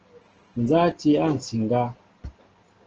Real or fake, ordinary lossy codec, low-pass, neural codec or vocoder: real; Opus, 16 kbps; 7.2 kHz; none